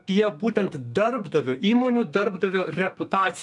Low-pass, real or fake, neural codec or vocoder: 10.8 kHz; fake; codec, 32 kHz, 1.9 kbps, SNAC